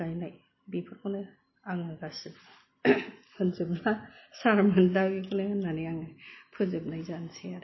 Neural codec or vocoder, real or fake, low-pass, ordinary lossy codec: none; real; 7.2 kHz; MP3, 24 kbps